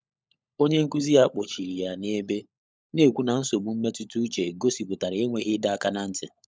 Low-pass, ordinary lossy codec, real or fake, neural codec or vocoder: none; none; fake; codec, 16 kHz, 16 kbps, FunCodec, trained on LibriTTS, 50 frames a second